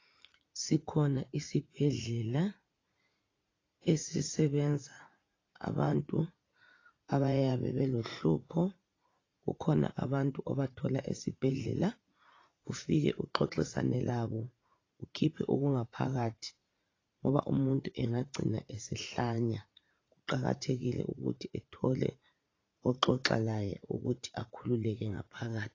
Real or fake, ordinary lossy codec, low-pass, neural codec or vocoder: fake; AAC, 32 kbps; 7.2 kHz; vocoder, 44.1 kHz, 80 mel bands, Vocos